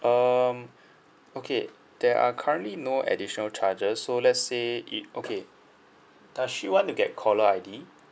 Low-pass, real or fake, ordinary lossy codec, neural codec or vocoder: none; real; none; none